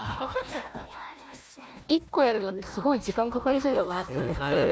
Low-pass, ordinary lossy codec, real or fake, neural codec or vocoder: none; none; fake; codec, 16 kHz, 1 kbps, FunCodec, trained on Chinese and English, 50 frames a second